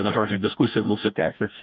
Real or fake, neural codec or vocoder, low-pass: fake; codec, 16 kHz, 1 kbps, FreqCodec, larger model; 7.2 kHz